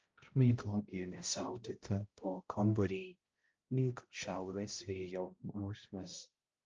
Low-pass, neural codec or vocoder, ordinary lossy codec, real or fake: 7.2 kHz; codec, 16 kHz, 0.5 kbps, X-Codec, HuBERT features, trained on balanced general audio; Opus, 24 kbps; fake